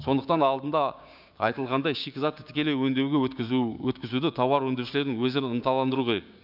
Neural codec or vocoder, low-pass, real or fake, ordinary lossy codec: codec, 16 kHz, 6 kbps, DAC; 5.4 kHz; fake; none